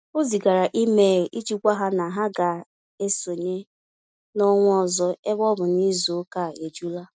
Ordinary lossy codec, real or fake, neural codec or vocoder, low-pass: none; real; none; none